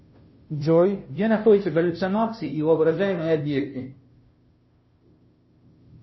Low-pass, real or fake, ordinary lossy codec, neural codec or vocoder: 7.2 kHz; fake; MP3, 24 kbps; codec, 16 kHz, 0.5 kbps, FunCodec, trained on Chinese and English, 25 frames a second